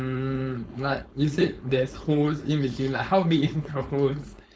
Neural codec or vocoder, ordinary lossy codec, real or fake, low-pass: codec, 16 kHz, 4.8 kbps, FACodec; none; fake; none